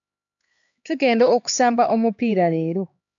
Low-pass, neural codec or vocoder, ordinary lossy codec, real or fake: 7.2 kHz; codec, 16 kHz, 2 kbps, X-Codec, HuBERT features, trained on LibriSpeech; MP3, 64 kbps; fake